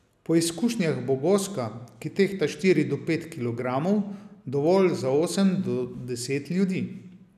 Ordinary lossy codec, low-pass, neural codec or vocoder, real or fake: none; 14.4 kHz; none; real